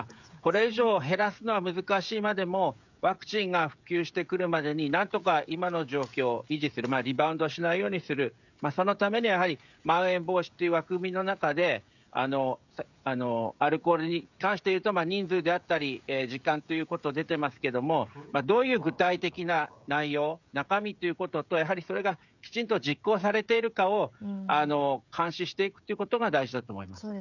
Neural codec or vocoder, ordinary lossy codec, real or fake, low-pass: codec, 16 kHz, 16 kbps, FreqCodec, smaller model; none; fake; 7.2 kHz